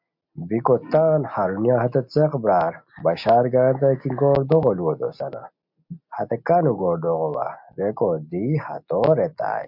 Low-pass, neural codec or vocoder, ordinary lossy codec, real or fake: 5.4 kHz; none; MP3, 48 kbps; real